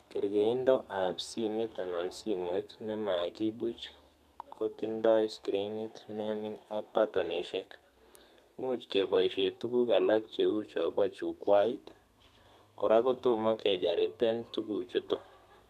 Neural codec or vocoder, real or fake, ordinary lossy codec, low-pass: codec, 32 kHz, 1.9 kbps, SNAC; fake; none; 14.4 kHz